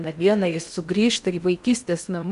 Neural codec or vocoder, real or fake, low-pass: codec, 16 kHz in and 24 kHz out, 0.6 kbps, FocalCodec, streaming, 4096 codes; fake; 10.8 kHz